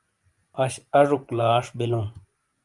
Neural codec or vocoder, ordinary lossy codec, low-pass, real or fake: vocoder, 24 kHz, 100 mel bands, Vocos; Opus, 32 kbps; 10.8 kHz; fake